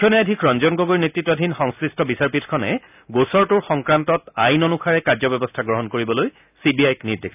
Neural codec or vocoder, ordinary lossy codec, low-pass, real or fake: none; none; 3.6 kHz; real